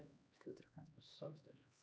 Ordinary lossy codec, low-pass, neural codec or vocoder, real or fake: none; none; codec, 16 kHz, 1 kbps, X-Codec, HuBERT features, trained on LibriSpeech; fake